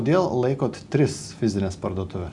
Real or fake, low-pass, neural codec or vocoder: real; 10.8 kHz; none